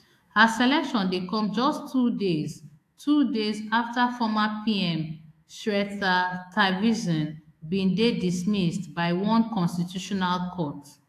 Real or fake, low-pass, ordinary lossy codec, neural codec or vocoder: fake; 14.4 kHz; AAC, 64 kbps; autoencoder, 48 kHz, 128 numbers a frame, DAC-VAE, trained on Japanese speech